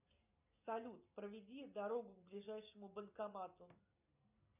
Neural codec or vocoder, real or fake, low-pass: none; real; 3.6 kHz